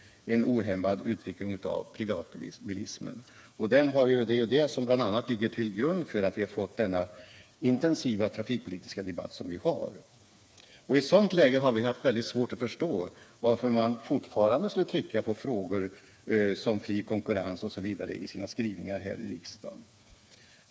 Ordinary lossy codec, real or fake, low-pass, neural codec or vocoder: none; fake; none; codec, 16 kHz, 4 kbps, FreqCodec, smaller model